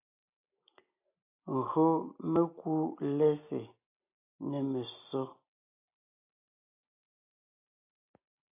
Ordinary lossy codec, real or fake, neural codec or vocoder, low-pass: AAC, 32 kbps; real; none; 3.6 kHz